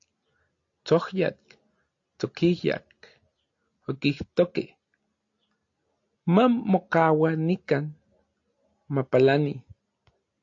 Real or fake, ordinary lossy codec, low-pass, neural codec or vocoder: real; AAC, 48 kbps; 7.2 kHz; none